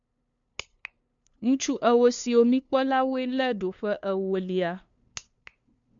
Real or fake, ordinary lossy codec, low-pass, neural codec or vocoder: fake; MP3, 64 kbps; 7.2 kHz; codec, 16 kHz, 2 kbps, FunCodec, trained on LibriTTS, 25 frames a second